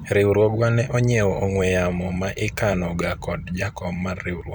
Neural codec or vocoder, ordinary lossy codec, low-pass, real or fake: vocoder, 44.1 kHz, 128 mel bands every 256 samples, BigVGAN v2; none; 19.8 kHz; fake